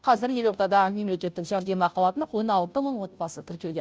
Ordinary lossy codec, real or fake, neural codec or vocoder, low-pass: none; fake; codec, 16 kHz, 0.5 kbps, FunCodec, trained on Chinese and English, 25 frames a second; none